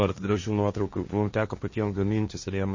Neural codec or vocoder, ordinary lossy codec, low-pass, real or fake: codec, 16 kHz, 1.1 kbps, Voila-Tokenizer; MP3, 32 kbps; 7.2 kHz; fake